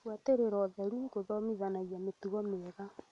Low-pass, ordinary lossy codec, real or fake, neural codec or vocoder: 10.8 kHz; none; real; none